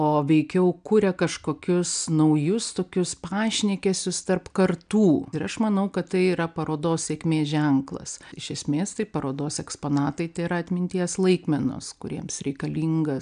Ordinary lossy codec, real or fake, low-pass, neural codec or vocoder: MP3, 96 kbps; real; 9.9 kHz; none